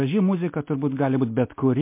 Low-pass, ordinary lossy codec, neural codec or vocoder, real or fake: 3.6 kHz; MP3, 24 kbps; none; real